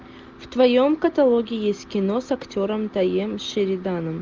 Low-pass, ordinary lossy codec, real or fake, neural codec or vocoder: 7.2 kHz; Opus, 24 kbps; real; none